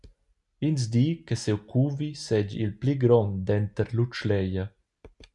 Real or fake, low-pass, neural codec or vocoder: real; 10.8 kHz; none